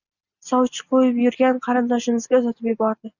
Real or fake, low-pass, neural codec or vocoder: real; 7.2 kHz; none